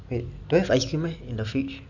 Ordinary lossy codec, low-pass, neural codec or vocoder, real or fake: none; 7.2 kHz; none; real